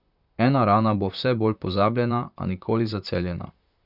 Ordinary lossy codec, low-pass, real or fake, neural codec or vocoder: none; 5.4 kHz; fake; vocoder, 44.1 kHz, 128 mel bands, Pupu-Vocoder